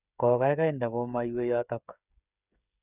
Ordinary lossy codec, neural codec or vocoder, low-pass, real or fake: none; codec, 16 kHz, 8 kbps, FreqCodec, smaller model; 3.6 kHz; fake